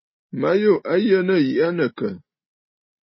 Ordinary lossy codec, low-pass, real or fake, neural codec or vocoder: MP3, 24 kbps; 7.2 kHz; real; none